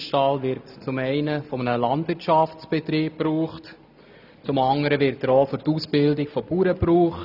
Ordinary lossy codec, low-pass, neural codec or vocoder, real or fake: none; 5.4 kHz; none; real